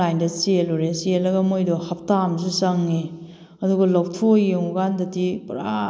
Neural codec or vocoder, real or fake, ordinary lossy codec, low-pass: none; real; none; none